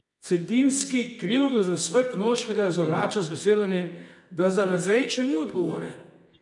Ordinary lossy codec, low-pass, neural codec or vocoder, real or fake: none; 10.8 kHz; codec, 24 kHz, 0.9 kbps, WavTokenizer, medium music audio release; fake